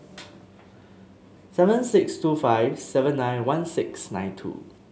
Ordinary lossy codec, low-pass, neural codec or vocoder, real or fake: none; none; none; real